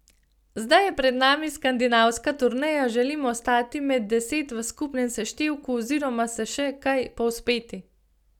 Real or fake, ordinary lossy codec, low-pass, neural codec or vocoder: real; none; 19.8 kHz; none